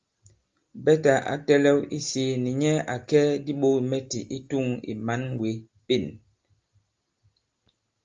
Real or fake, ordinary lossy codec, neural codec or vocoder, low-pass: real; Opus, 32 kbps; none; 7.2 kHz